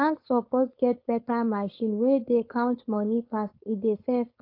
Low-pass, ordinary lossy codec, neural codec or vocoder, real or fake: 5.4 kHz; AAC, 32 kbps; codec, 16 kHz, 4.8 kbps, FACodec; fake